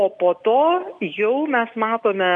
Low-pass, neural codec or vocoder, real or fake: 10.8 kHz; none; real